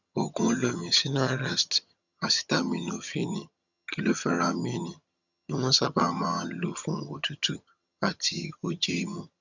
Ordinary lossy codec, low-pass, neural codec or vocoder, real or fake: none; 7.2 kHz; vocoder, 22.05 kHz, 80 mel bands, HiFi-GAN; fake